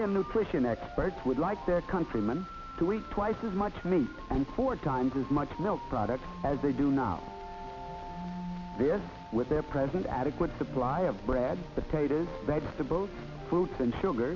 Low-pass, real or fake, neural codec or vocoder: 7.2 kHz; real; none